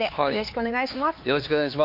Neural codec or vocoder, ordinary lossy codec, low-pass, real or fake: codec, 16 kHz, 4 kbps, X-Codec, HuBERT features, trained on LibriSpeech; MP3, 32 kbps; 5.4 kHz; fake